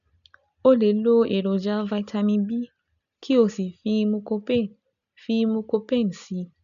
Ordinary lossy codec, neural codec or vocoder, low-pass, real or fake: none; none; 7.2 kHz; real